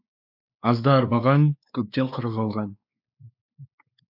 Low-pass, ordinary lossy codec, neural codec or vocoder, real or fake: 5.4 kHz; AAC, 48 kbps; codec, 16 kHz, 2 kbps, X-Codec, WavLM features, trained on Multilingual LibriSpeech; fake